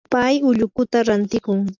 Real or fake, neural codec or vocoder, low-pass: real; none; 7.2 kHz